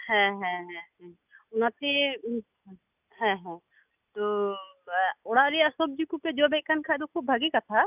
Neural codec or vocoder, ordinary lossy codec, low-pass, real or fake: none; none; 3.6 kHz; real